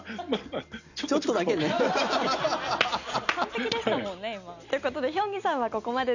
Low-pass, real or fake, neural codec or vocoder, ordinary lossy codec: 7.2 kHz; real; none; none